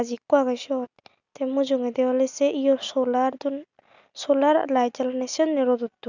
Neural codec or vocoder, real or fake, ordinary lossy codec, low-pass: none; real; none; 7.2 kHz